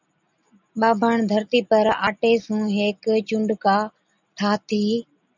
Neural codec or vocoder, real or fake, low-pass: none; real; 7.2 kHz